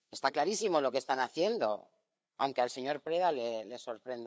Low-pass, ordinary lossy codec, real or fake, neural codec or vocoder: none; none; fake; codec, 16 kHz, 4 kbps, FreqCodec, larger model